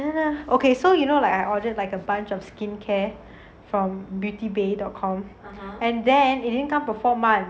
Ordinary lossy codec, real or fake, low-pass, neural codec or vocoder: none; real; none; none